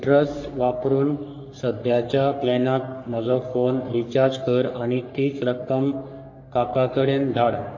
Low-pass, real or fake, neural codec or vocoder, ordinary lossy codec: 7.2 kHz; fake; codec, 44.1 kHz, 3.4 kbps, Pupu-Codec; AAC, 48 kbps